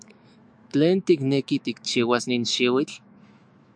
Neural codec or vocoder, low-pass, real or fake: autoencoder, 48 kHz, 128 numbers a frame, DAC-VAE, trained on Japanese speech; 9.9 kHz; fake